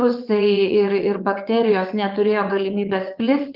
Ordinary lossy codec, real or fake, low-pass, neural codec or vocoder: Opus, 24 kbps; fake; 5.4 kHz; vocoder, 22.05 kHz, 80 mel bands, WaveNeXt